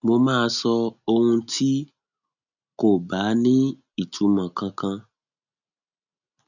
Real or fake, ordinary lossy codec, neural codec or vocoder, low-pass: real; none; none; 7.2 kHz